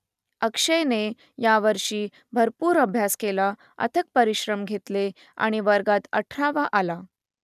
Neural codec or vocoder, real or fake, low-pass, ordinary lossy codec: none; real; 14.4 kHz; none